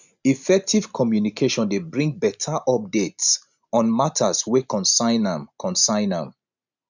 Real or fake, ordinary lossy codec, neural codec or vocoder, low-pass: real; none; none; 7.2 kHz